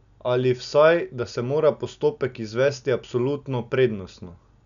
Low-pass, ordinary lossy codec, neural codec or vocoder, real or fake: 7.2 kHz; none; none; real